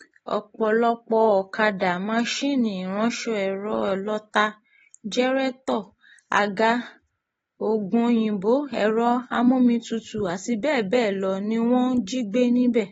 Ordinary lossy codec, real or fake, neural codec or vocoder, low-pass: AAC, 24 kbps; real; none; 19.8 kHz